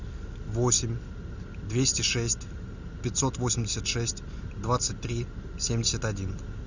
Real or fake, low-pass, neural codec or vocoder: real; 7.2 kHz; none